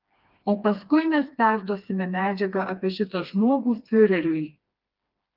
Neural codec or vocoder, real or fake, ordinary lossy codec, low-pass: codec, 16 kHz, 2 kbps, FreqCodec, smaller model; fake; Opus, 32 kbps; 5.4 kHz